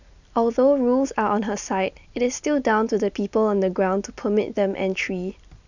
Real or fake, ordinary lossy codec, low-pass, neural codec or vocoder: real; none; 7.2 kHz; none